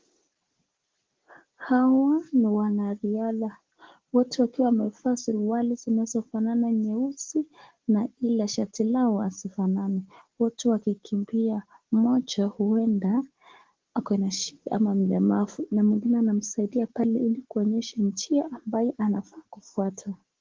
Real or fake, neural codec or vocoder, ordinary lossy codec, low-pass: real; none; Opus, 16 kbps; 7.2 kHz